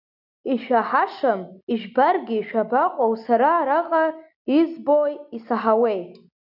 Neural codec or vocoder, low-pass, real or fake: none; 5.4 kHz; real